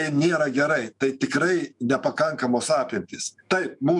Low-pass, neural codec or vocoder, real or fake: 10.8 kHz; autoencoder, 48 kHz, 128 numbers a frame, DAC-VAE, trained on Japanese speech; fake